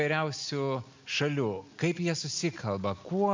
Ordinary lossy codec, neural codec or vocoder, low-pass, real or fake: MP3, 64 kbps; none; 7.2 kHz; real